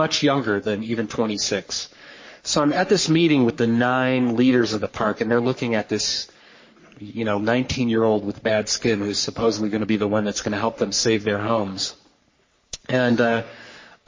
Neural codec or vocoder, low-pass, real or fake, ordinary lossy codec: codec, 44.1 kHz, 3.4 kbps, Pupu-Codec; 7.2 kHz; fake; MP3, 32 kbps